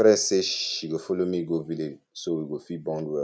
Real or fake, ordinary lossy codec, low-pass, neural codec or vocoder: real; none; none; none